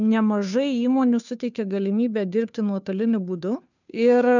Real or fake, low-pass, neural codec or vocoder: fake; 7.2 kHz; codec, 16 kHz, 2 kbps, FunCodec, trained on Chinese and English, 25 frames a second